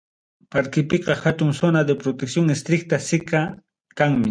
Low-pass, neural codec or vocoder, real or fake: 9.9 kHz; none; real